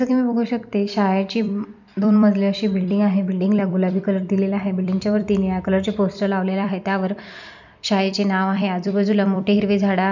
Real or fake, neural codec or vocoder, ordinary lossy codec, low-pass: fake; vocoder, 44.1 kHz, 128 mel bands every 256 samples, BigVGAN v2; none; 7.2 kHz